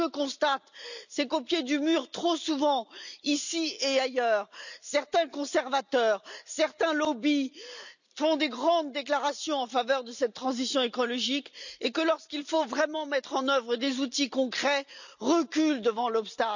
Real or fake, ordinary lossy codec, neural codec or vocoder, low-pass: real; none; none; 7.2 kHz